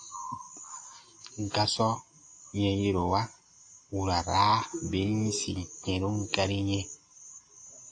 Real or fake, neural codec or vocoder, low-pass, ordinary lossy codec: real; none; 9.9 kHz; AAC, 48 kbps